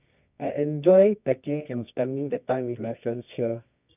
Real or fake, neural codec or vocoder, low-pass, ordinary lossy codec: fake; codec, 24 kHz, 0.9 kbps, WavTokenizer, medium music audio release; 3.6 kHz; none